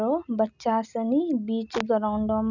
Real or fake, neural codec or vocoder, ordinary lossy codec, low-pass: real; none; none; 7.2 kHz